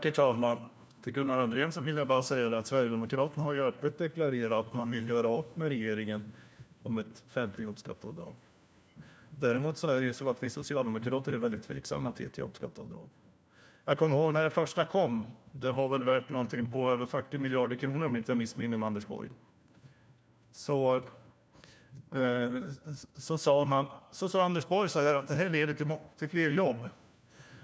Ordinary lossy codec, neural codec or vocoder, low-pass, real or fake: none; codec, 16 kHz, 1 kbps, FunCodec, trained on LibriTTS, 50 frames a second; none; fake